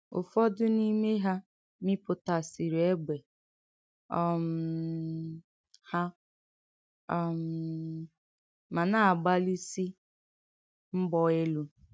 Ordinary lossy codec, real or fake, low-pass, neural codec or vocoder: none; real; none; none